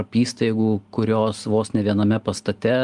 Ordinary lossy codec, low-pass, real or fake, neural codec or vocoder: Opus, 32 kbps; 10.8 kHz; real; none